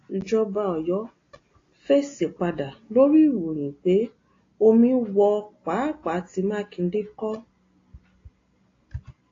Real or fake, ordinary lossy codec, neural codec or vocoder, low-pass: real; AAC, 32 kbps; none; 7.2 kHz